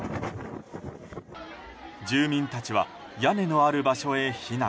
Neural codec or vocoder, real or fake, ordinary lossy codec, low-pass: none; real; none; none